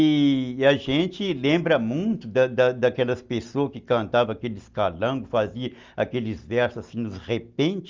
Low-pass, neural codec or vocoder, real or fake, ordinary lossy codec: 7.2 kHz; none; real; Opus, 32 kbps